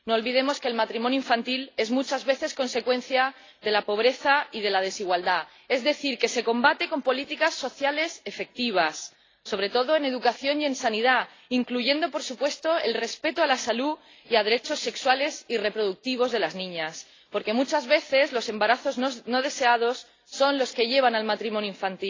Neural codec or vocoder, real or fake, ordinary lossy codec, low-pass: none; real; AAC, 32 kbps; 7.2 kHz